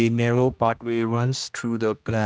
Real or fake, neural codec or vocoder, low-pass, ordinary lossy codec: fake; codec, 16 kHz, 1 kbps, X-Codec, HuBERT features, trained on general audio; none; none